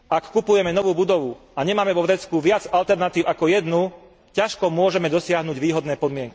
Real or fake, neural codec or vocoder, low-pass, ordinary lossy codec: real; none; none; none